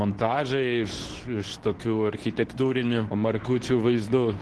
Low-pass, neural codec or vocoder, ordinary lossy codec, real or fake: 10.8 kHz; codec, 24 kHz, 0.9 kbps, WavTokenizer, medium speech release version 2; Opus, 16 kbps; fake